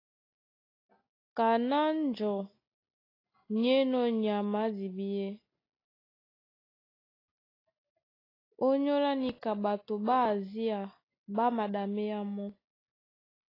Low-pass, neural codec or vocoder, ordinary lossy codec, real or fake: 5.4 kHz; none; AAC, 24 kbps; real